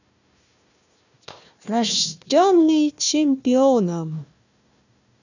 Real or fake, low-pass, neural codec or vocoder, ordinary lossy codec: fake; 7.2 kHz; codec, 16 kHz, 1 kbps, FunCodec, trained on Chinese and English, 50 frames a second; none